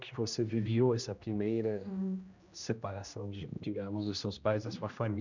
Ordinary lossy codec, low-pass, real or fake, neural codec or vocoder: none; 7.2 kHz; fake; codec, 16 kHz, 1 kbps, X-Codec, HuBERT features, trained on general audio